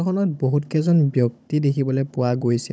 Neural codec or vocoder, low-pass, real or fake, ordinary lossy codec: codec, 16 kHz, 16 kbps, FunCodec, trained on Chinese and English, 50 frames a second; none; fake; none